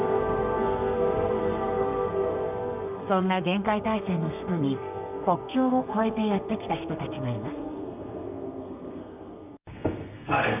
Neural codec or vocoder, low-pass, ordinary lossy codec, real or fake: codec, 32 kHz, 1.9 kbps, SNAC; 3.6 kHz; none; fake